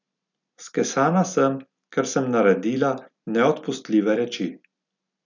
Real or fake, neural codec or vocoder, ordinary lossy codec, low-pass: real; none; none; 7.2 kHz